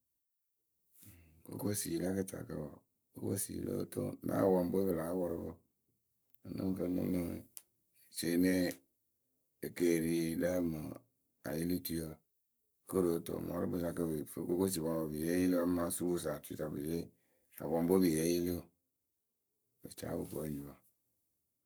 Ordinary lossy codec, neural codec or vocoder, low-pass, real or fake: none; codec, 44.1 kHz, 7.8 kbps, Pupu-Codec; none; fake